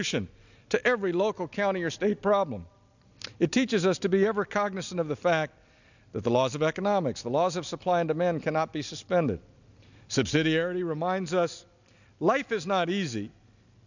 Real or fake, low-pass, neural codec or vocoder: real; 7.2 kHz; none